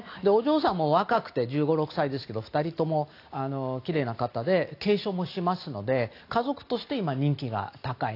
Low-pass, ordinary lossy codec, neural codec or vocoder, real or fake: 5.4 kHz; AAC, 32 kbps; none; real